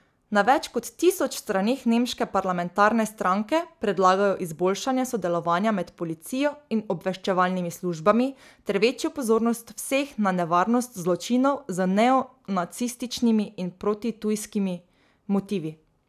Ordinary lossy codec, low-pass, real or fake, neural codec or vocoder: none; 14.4 kHz; real; none